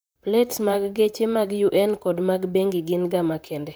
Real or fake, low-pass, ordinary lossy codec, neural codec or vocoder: fake; none; none; vocoder, 44.1 kHz, 128 mel bands, Pupu-Vocoder